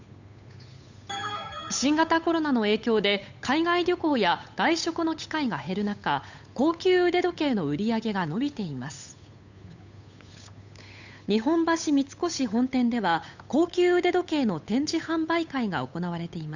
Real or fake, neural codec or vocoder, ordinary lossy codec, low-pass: fake; codec, 16 kHz, 8 kbps, FunCodec, trained on Chinese and English, 25 frames a second; none; 7.2 kHz